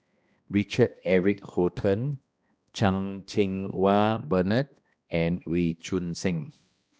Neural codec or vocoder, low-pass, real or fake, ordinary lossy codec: codec, 16 kHz, 1 kbps, X-Codec, HuBERT features, trained on balanced general audio; none; fake; none